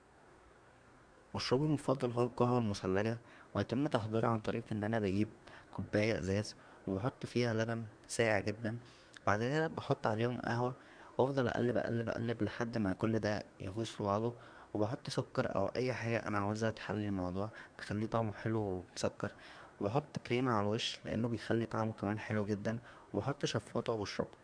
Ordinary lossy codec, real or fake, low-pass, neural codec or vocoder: none; fake; 9.9 kHz; codec, 24 kHz, 1 kbps, SNAC